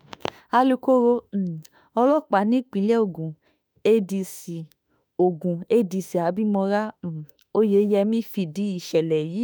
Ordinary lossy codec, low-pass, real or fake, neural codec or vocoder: none; none; fake; autoencoder, 48 kHz, 32 numbers a frame, DAC-VAE, trained on Japanese speech